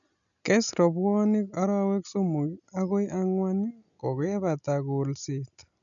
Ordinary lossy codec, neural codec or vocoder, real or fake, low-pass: none; none; real; 7.2 kHz